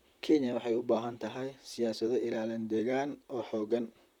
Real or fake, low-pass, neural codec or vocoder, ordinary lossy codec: fake; 19.8 kHz; vocoder, 44.1 kHz, 128 mel bands, Pupu-Vocoder; none